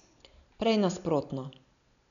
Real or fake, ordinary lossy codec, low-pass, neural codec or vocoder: real; none; 7.2 kHz; none